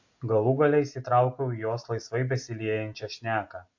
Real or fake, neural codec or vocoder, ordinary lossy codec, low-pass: real; none; AAC, 48 kbps; 7.2 kHz